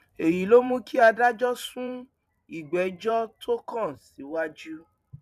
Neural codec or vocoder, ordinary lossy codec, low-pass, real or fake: vocoder, 44.1 kHz, 128 mel bands every 512 samples, BigVGAN v2; none; 14.4 kHz; fake